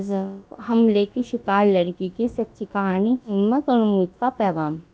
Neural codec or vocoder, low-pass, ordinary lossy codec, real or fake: codec, 16 kHz, about 1 kbps, DyCAST, with the encoder's durations; none; none; fake